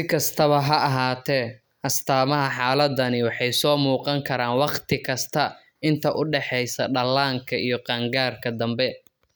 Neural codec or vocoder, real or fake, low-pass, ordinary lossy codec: none; real; none; none